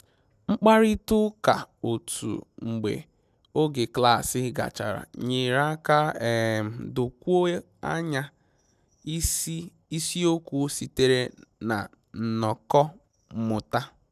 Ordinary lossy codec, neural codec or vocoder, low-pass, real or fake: none; none; 14.4 kHz; real